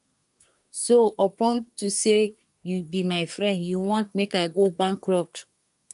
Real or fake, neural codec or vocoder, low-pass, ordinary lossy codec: fake; codec, 24 kHz, 1 kbps, SNAC; 10.8 kHz; none